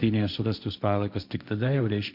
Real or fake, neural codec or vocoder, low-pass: fake; codec, 16 kHz, 1.1 kbps, Voila-Tokenizer; 5.4 kHz